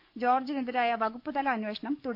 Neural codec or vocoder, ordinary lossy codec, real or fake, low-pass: codec, 44.1 kHz, 7.8 kbps, DAC; none; fake; 5.4 kHz